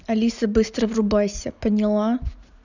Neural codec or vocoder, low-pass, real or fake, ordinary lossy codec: none; 7.2 kHz; real; none